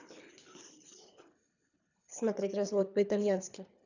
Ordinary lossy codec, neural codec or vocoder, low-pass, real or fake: none; codec, 24 kHz, 3 kbps, HILCodec; 7.2 kHz; fake